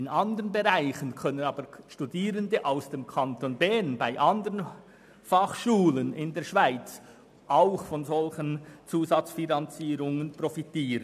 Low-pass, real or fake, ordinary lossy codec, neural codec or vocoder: 14.4 kHz; real; none; none